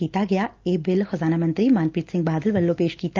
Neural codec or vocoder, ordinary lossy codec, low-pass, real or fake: none; Opus, 32 kbps; 7.2 kHz; real